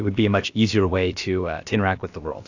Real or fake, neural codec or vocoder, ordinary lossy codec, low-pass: fake; codec, 16 kHz, about 1 kbps, DyCAST, with the encoder's durations; AAC, 48 kbps; 7.2 kHz